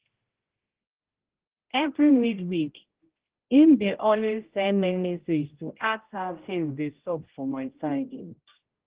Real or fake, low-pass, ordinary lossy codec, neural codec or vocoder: fake; 3.6 kHz; Opus, 16 kbps; codec, 16 kHz, 0.5 kbps, X-Codec, HuBERT features, trained on general audio